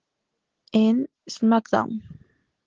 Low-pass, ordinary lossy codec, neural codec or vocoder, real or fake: 7.2 kHz; Opus, 16 kbps; none; real